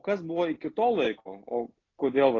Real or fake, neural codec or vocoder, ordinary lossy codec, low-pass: real; none; AAC, 32 kbps; 7.2 kHz